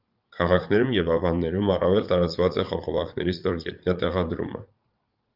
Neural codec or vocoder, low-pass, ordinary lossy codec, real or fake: vocoder, 44.1 kHz, 80 mel bands, Vocos; 5.4 kHz; Opus, 24 kbps; fake